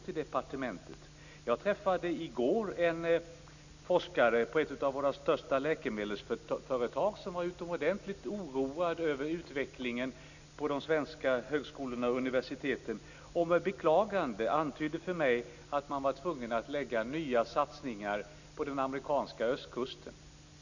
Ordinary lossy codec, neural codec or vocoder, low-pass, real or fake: none; none; 7.2 kHz; real